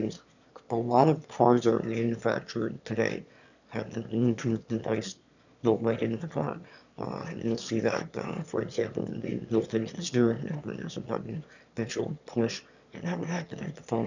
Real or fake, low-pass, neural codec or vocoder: fake; 7.2 kHz; autoencoder, 22.05 kHz, a latent of 192 numbers a frame, VITS, trained on one speaker